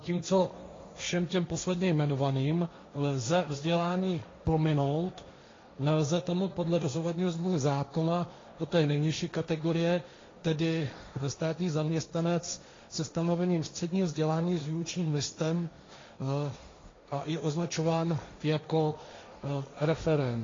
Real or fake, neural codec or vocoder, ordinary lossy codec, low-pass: fake; codec, 16 kHz, 1.1 kbps, Voila-Tokenizer; AAC, 32 kbps; 7.2 kHz